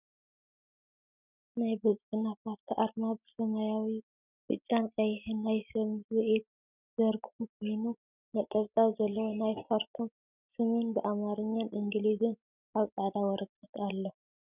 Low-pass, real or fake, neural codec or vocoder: 3.6 kHz; real; none